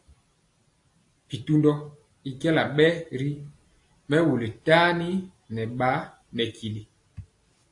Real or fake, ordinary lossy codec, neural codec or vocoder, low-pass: real; AAC, 48 kbps; none; 10.8 kHz